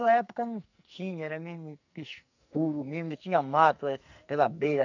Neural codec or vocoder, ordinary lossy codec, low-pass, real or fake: codec, 44.1 kHz, 2.6 kbps, SNAC; none; 7.2 kHz; fake